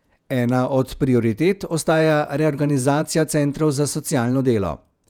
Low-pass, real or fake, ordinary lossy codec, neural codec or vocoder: 19.8 kHz; fake; none; vocoder, 48 kHz, 128 mel bands, Vocos